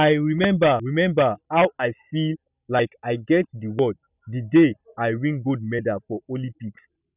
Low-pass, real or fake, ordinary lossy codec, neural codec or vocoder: 3.6 kHz; real; none; none